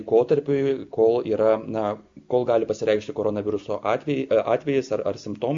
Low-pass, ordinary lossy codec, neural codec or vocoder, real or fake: 7.2 kHz; MP3, 48 kbps; none; real